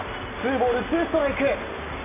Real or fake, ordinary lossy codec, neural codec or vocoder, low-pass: real; none; none; 3.6 kHz